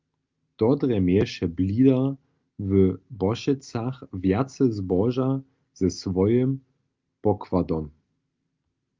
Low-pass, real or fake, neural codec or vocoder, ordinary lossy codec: 7.2 kHz; real; none; Opus, 32 kbps